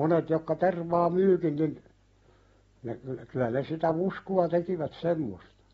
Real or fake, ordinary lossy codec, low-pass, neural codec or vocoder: real; AAC, 24 kbps; 19.8 kHz; none